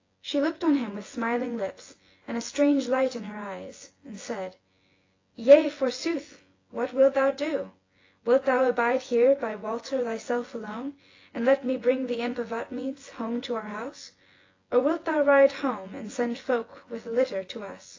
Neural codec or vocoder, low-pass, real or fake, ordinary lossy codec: vocoder, 24 kHz, 100 mel bands, Vocos; 7.2 kHz; fake; AAC, 32 kbps